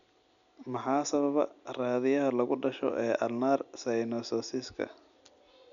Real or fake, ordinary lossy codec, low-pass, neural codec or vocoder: real; none; 7.2 kHz; none